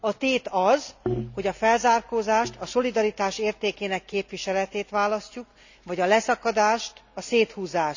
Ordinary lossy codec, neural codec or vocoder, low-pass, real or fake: none; none; 7.2 kHz; real